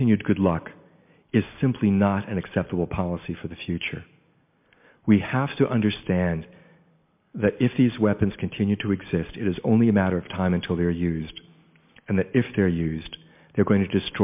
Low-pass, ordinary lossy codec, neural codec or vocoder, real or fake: 3.6 kHz; MP3, 32 kbps; none; real